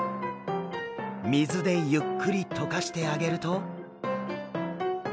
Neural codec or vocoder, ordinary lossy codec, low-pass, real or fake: none; none; none; real